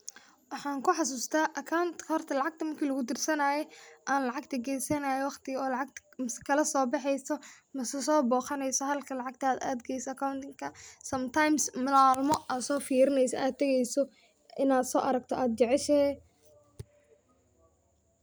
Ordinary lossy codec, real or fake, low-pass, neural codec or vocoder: none; real; none; none